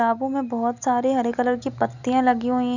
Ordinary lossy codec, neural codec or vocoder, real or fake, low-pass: none; none; real; 7.2 kHz